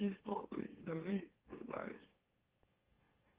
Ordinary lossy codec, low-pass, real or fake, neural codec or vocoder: Opus, 16 kbps; 3.6 kHz; fake; autoencoder, 44.1 kHz, a latent of 192 numbers a frame, MeloTTS